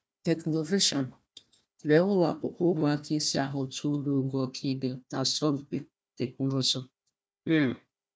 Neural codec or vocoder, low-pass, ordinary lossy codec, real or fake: codec, 16 kHz, 1 kbps, FunCodec, trained on Chinese and English, 50 frames a second; none; none; fake